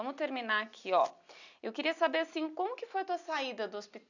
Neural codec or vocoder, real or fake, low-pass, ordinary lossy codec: none; real; 7.2 kHz; none